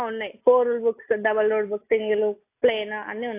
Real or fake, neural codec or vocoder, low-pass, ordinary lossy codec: real; none; 3.6 kHz; AAC, 24 kbps